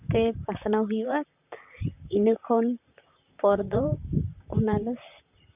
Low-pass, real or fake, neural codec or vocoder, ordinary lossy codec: 3.6 kHz; fake; vocoder, 44.1 kHz, 128 mel bands, Pupu-Vocoder; none